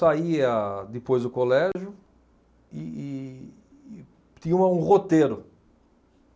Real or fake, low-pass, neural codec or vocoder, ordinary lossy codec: real; none; none; none